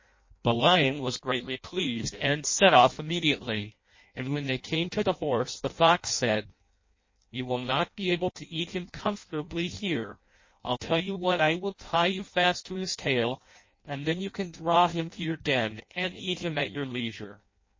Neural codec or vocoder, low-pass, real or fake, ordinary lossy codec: codec, 16 kHz in and 24 kHz out, 0.6 kbps, FireRedTTS-2 codec; 7.2 kHz; fake; MP3, 32 kbps